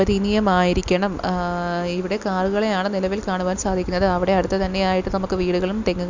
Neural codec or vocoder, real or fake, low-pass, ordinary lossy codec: none; real; 7.2 kHz; Opus, 64 kbps